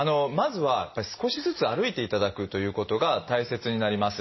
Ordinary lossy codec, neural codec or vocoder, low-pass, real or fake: MP3, 24 kbps; none; 7.2 kHz; real